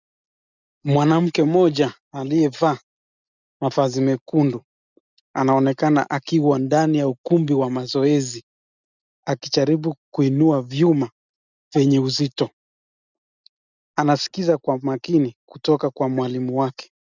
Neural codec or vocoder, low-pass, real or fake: none; 7.2 kHz; real